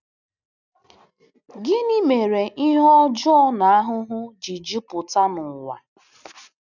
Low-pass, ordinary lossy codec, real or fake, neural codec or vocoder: 7.2 kHz; none; real; none